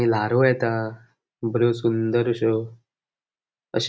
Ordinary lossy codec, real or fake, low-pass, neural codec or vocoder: none; real; none; none